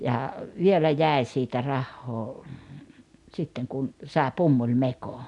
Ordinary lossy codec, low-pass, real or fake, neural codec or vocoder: none; 10.8 kHz; real; none